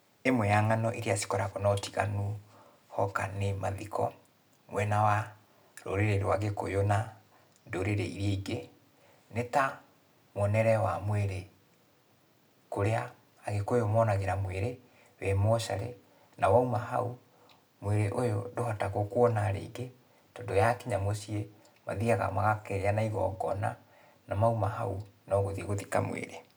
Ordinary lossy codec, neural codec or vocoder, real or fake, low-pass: none; none; real; none